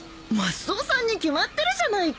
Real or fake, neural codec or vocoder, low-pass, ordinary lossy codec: real; none; none; none